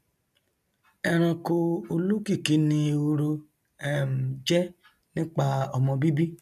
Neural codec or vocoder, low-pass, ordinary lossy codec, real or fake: none; 14.4 kHz; none; real